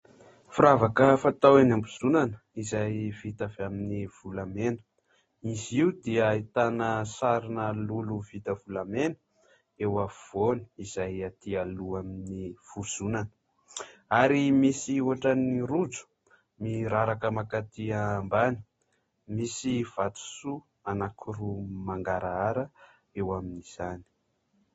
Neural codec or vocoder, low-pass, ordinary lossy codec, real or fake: none; 19.8 kHz; AAC, 24 kbps; real